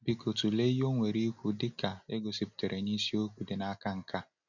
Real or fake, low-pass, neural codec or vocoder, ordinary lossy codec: real; 7.2 kHz; none; none